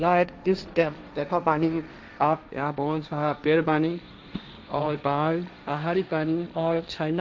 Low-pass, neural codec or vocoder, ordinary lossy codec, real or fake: none; codec, 16 kHz, 1.1 kbps, Voila-Tokenizer; none; fake